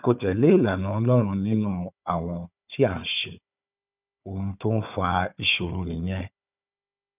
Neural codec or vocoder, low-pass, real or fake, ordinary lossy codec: codec, 16 kHz, 4 kbps, FunCodec, trained on Chinese and English, 50 frames a second; 3.6 kHz; fake; none